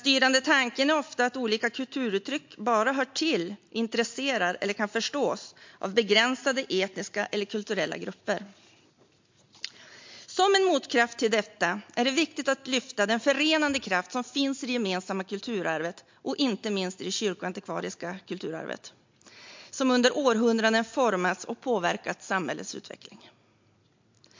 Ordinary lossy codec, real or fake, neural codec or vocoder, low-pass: MP3, 48 kbps; real; none; 7.2 kHz